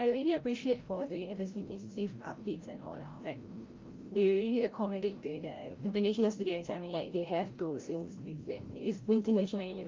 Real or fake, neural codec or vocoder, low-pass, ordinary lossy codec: fake; codec, 16 kHz, 0.5 kbps, FreqCodec, larger model; 7.2 kHz; Opus, 32 kbps